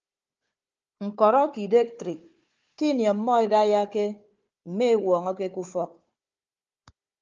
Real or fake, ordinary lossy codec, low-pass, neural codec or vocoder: fake; Opus, 24 kbps; 7.2 kHz; codec, 16 kHz, 4 kbps, FunCodec, trained on Chinese and English, 50 frames a second